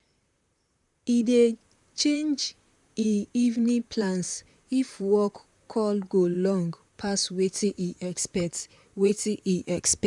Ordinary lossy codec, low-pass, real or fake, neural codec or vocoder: none; 10.8 kHz; fake; vocoder, 44.1 kHz, 128 mel bands, Pupu-Vocoder